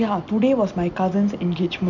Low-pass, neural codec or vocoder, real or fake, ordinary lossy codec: 7.2 kHz; none; real; none